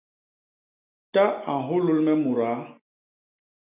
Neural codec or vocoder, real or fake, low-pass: none; real; 3.6 kHz